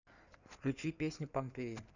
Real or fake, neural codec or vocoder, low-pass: fake; codec, 16 kHz in and 24 kHz out, 2.2 kbps, FireRedTTS-2 codec; 7.2 kHz